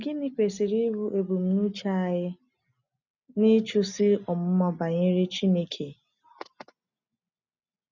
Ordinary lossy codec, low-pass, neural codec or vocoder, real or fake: none; 7.2 kHz; none; real